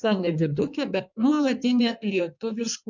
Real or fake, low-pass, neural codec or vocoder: fake; 7.2 kHz; codec, 16 kHz in and 24 kHz out, 1.1 kbps, FireRedTTS-2 codec